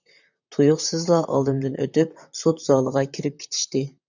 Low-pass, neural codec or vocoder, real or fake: 7.2 kHz; vocoder, 44.1 kHz, 128 mel bands, Pupu-Vocoder; fake